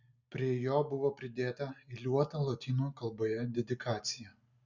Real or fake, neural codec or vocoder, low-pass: real; none; 7.2 kHz